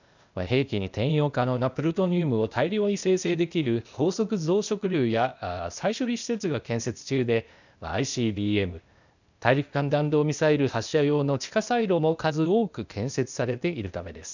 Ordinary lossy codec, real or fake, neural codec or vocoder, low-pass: none; fake; codec, 16 kHz, 0.8 kbps, ZipCodec; 7.2 kHz